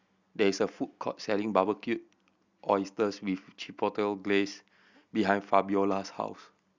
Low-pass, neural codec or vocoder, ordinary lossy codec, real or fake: 7.2 kHz; none; Opus, 64 kbps; real